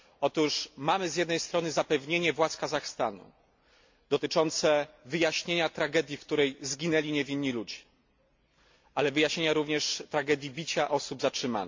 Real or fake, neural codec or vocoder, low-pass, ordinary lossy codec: real; none; 7.2 kHz; MP3, 48 kbps